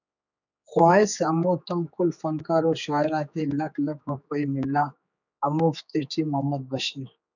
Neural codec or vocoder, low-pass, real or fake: codec, 16 kHz, 4 kbps, X-Codec, HuBERT features, trained on general audio; 7.2 kHz; fake